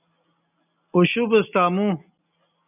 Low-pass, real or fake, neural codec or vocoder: 3.6 kHz; real; none